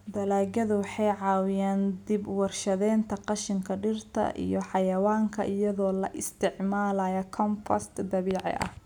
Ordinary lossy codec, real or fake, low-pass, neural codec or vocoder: none; real; 19.8 kHz; none